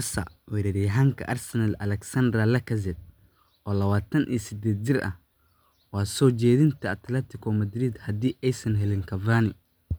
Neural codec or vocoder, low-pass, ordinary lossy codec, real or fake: none; none; none; real